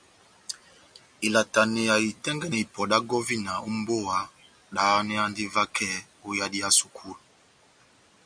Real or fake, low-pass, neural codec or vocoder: real; 9.9 kHz; none